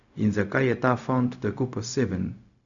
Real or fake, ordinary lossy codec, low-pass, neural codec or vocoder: fake; none; 7.2 kHz; codec, 16 kHz, 0.4 kbps, LongCat-Audio-Codec